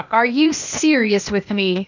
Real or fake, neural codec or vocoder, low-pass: fake; codec, 16 kHz, 0.8 kbps, ZipCodec; 7.2 kHz